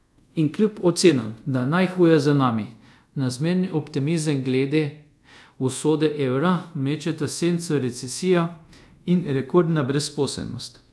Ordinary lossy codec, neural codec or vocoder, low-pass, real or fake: none; codec, 24 kHz, 0.5 kbps, DualCodec; none; fake